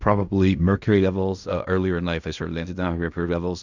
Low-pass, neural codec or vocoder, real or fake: 7.2 kHz; codec, 16 kHz in and 24 kHz out, 0.4 kbps, LongCat-Audio-Codec, fine tuned four codebook decoder; fake